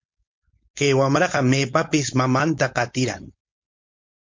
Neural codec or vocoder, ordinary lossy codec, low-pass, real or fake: codec, 16 kHz, 4.8 kbps, FACodec; MP3, 48 kbps; 7.2 kHz; fake